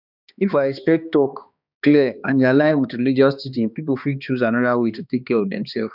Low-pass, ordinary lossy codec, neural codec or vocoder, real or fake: 5.4 kHz; none; codec, 16 kHz, 2 kbps, X-Codec, HuBERT features, trained on balanced general audio; fake